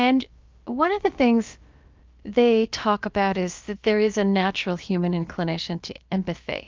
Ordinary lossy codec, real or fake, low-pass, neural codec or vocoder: Opus, 24 kbps; fake; 7.2 kHz; codec, 16 kHz, about 1 kbps, DyCAST, with the encoder's durations